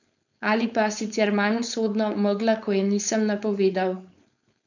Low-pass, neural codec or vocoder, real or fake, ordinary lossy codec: 7.2 kHz; codec, 16 kHz, 4.8 kbps, FACodec; fake; none